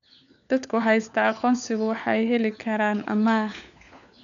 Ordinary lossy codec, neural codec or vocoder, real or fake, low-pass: none; codec, 16 kHz, 4 kbps, FunCodec, trained on LibriTTS, 50 frames a second; fake; 7.2 kHz